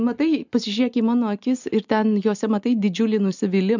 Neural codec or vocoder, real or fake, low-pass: none; real; 7.2 kHz